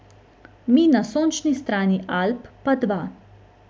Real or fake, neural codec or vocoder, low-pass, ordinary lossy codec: real; none; none; none